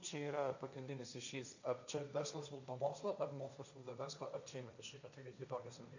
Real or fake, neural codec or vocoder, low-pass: fake; codec, 16 kHz, 1.1 kbps, Voila-Tokenizer; 7.2 kHz